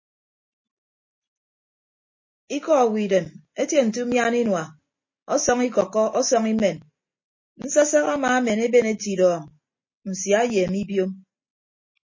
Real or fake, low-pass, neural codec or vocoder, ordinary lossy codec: real; 7.2 kHz; none; MP3, 32 kbps